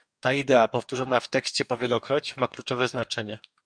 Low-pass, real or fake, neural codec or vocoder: 9.9 kHz; fake; codec, 44.1 kHz, 2.6 kbps, DAC